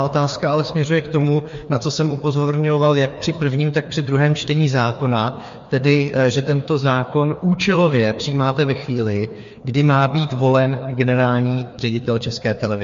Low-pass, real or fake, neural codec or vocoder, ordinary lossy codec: 7.2 kHz; fake; codec, 16 kHz, 2 kbps, FreqCodec, larger model; MP3, 48 kbps